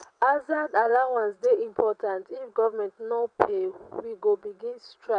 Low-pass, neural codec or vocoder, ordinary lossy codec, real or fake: 9.9 kHz; vocoder, 22.05 kHz, 80 mel bands, WaveNeXt; none; fake